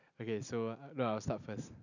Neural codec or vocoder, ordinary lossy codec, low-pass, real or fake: none; none; 7.2 kHz; real